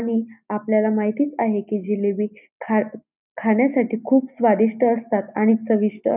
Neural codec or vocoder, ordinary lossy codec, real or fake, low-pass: none; none; real; 3.6 kHz